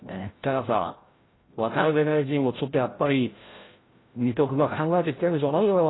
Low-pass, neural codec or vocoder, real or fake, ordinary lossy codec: 7.2 kHz; codec, 16 kHz, 0.5 kbps, FreqCodec, larger model; fake; AAC, 16 kbps